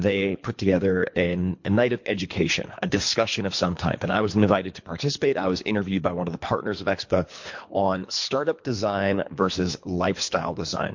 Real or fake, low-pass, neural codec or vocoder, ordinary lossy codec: fake; 7.2 kHz; codec, 24 kHz, 3 kbps, HILCodec; MP3, 48 kbps